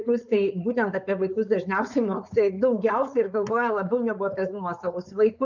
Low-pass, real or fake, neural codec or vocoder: 7.2 kHz; fake; codec, 16 kHz, 4.8 kbps, FACodec